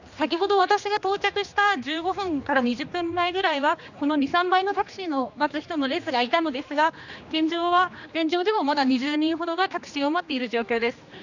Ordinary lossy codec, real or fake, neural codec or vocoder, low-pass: none; fake; codec, 16 kHz, 2 kbps, X-Codec, HuBERT features, trained on general audio; 7.2 kHz